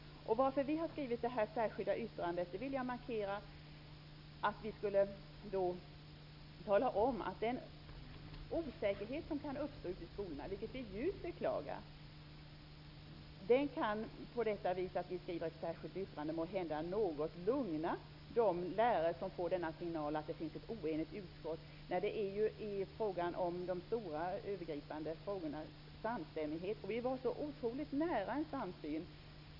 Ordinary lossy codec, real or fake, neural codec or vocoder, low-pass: none; real; none; 5.4 kHz